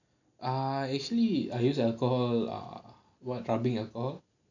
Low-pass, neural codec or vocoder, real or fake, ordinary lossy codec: 7.2 kHz; none; real; none